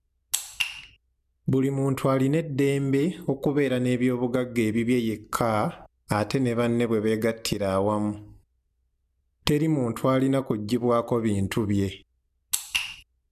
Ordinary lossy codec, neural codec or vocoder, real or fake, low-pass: none; none; real; 14.4 kHz